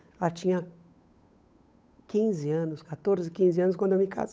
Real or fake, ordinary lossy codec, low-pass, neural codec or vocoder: fake; none; none; codec, 16 kHz, 8 kbps, FunCodec, trained on Chinese and English, 25 frames a second